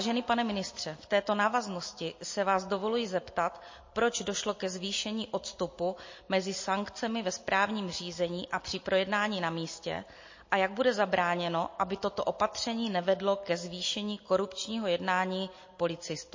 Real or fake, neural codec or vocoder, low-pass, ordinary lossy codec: real; none; 7.2 kHz; MP3, 32 kbps